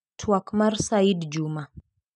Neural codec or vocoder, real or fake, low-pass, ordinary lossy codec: none; real; 10.8 kHz; none